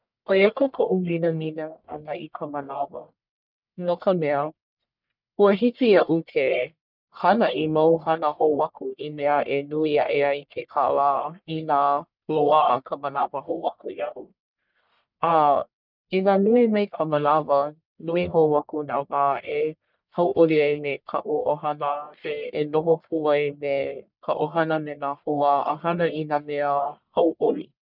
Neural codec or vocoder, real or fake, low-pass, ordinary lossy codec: codec, 44.1 kHz, 1.7 kbps, Pupu-Codec; fake; 5.4 kHz; none